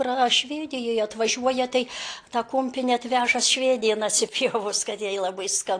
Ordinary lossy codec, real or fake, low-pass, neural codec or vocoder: AAC, 48 kbps; real; 9.9 kHz; none